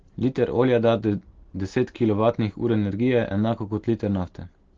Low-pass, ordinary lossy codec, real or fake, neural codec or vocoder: 7.2 kHz; Opus, 16 kbps; real; none